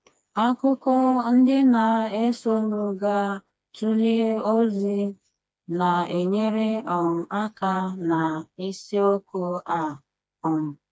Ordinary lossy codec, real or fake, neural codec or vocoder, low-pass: none; fake; codec, 16 kHz, 2 kbps, FreqCodec, smaller model; none